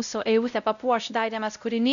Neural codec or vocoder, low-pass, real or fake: codec, 16 kHz, 0.5 kbps, X-Codec, WavLM features, trained on Multilingual LibriSpeech; 7.2 kHz; fake